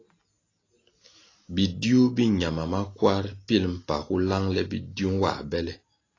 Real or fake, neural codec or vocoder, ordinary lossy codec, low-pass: real; none; AAC, 32 kbps; 7.2 kHz